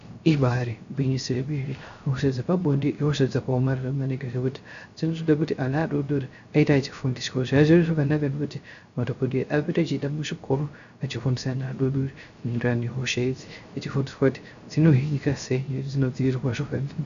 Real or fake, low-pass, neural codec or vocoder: fake; 7.2 kHz; codec, 16 kHz, 0.3 kbps, FocalCodec